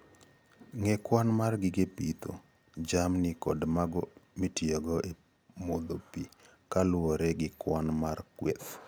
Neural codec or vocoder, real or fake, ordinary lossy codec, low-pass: none; real; none; none